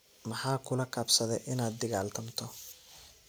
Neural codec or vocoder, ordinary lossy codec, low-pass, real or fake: none; none; none; real